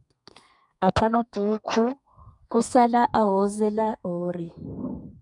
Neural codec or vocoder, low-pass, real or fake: codec, 32 kHz, 1.9 kbps, SNAC; 10.8 kHz; fake